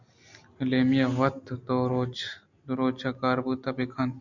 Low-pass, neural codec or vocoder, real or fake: 7.2 kHz; none; real